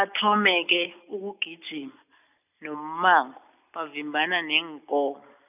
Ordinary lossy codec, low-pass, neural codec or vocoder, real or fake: none; 3.6 kHz; none; real